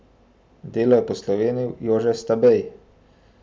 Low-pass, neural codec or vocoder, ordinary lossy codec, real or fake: none; none; none; real